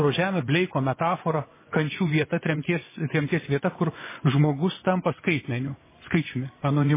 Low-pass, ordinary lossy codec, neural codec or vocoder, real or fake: 3.6 kHz; MP3, 16 kbps; vocoder, 24 kHz, 100 mel bands, Vocos; fake